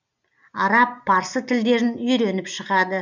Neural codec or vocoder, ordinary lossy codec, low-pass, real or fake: none; none; 7.2 kHz; real